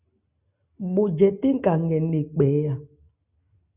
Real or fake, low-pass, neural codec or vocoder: real; 3.6 kHz; none